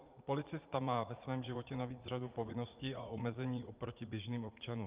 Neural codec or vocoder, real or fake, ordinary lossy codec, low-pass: vocoder, 44.1 kHz, 80 mel bands, Vocos; fake; Opus, 24 kbps; 3.6 kHz